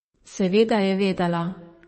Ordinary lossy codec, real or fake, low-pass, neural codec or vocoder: MP3, 32 kbps; fake; 10.8 kHz; codec, 32 kHz, 1.9 kbps, SNAC